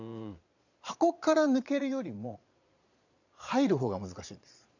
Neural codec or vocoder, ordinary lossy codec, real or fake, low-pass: vocoder, 22.05 kHz, 80 mel bands, Vocos; none; fake; 7.2 kHz